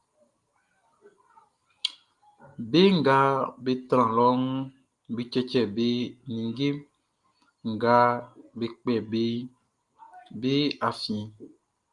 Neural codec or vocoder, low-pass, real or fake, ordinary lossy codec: none; 10.8 kHz; real; Opus, 32 kbps